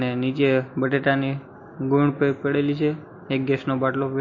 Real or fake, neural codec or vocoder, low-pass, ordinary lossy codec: real; none; 7.2 kHz; MP3, 32 kbps